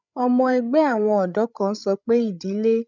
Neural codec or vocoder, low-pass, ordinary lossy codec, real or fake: codec, 16 kHz, 8 kbps, FreqCodec, larger model; 7.2 kHz; none; fake